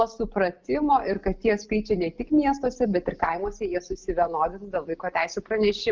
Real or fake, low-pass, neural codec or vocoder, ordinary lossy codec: real; 7.2 kHz; none; Opus, 32 kbps